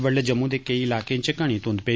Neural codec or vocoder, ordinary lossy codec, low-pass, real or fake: none; none; none; real